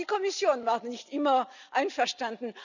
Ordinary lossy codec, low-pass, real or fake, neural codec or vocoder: none; 7.2 kHz; real; none